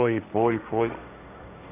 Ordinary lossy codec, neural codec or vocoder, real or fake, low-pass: none; codec, 16 kHz, 1.1 kbps, Voila-Tokenizer; fake; 3.6 kHz